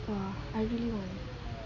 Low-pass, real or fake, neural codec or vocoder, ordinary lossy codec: 7.2 kHz; real; none; none